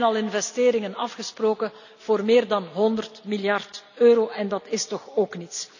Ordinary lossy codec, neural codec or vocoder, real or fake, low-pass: none; none; real; 7.2 kHz